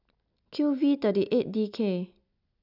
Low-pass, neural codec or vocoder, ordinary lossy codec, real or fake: 5.4 kHz; none; none; real